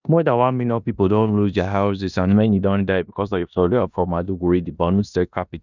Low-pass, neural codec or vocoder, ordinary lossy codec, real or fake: 7.2 kHz; codec, 16 kHz in and 24 kHz out, 0.9 kbps, LongCat-Audio-Codec, four codebook decoder; none; fake